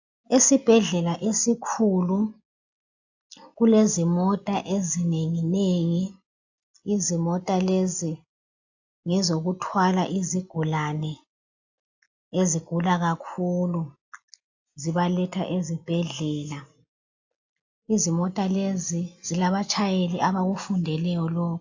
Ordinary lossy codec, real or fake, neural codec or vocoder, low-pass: AAC, 48 kbps; real; none; 7.2 kHz